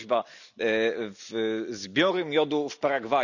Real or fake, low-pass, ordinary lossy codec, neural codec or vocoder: real; 7.2 kHz; none; none